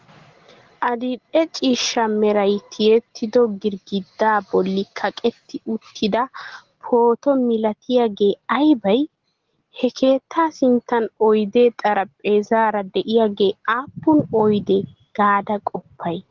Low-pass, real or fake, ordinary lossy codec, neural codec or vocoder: 7.2 kHz; real; Opus, 16 kbps; none